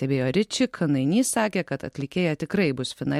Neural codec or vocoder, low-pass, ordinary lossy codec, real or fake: none; 19.8 kHz; MP3, 64 kbps; real